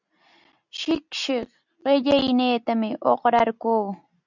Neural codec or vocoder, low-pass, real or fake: none; 7.2 kHz; real